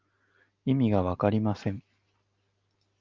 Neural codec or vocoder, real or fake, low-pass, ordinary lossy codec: none; real; 7.2 kHz; Opus, 24 kbps